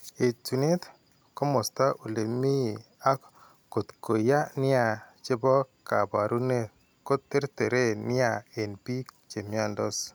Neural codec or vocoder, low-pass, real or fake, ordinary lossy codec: none; none; real; none